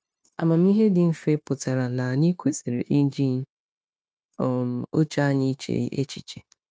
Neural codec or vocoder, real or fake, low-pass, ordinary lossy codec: codec, 16 kHz, 0.9 kbps, LongCat-Audio-Codec; fake; none; none